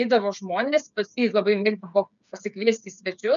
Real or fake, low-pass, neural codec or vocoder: fake; 7.2 kHz; codec, 16 kHz, 8 kbps, FreqCodec, smaller model